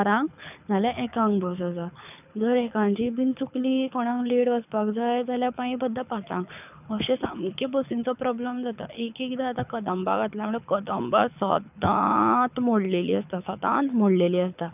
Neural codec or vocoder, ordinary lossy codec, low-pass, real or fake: codec, 24 kHz, 6 kbps, HILCodec; none; 3.6 kHz; fake